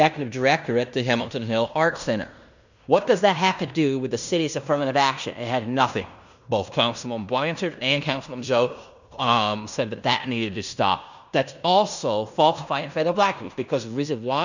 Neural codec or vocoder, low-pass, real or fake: codec, 16 kHz in and 24 kHz out, 0.9 kbps, LongCat-Audio-Codec, fine tuned four codebook decoder; 7.2 kHz; fake